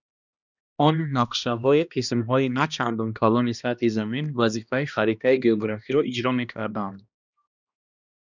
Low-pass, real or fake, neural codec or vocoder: 7.2 kHz; fake; codec, 16 kHz, 1 kbps, X-Codec, HuBERT features, trained on balanced general audio